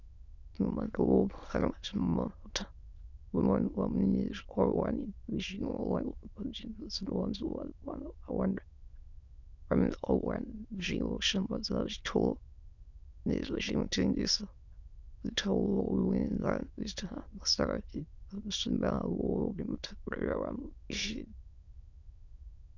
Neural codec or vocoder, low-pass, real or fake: autoencoder, 22.05 kHz, a latent of 192 numbers a frame, VITS, trained on many speakers; 7.2 kHz; fake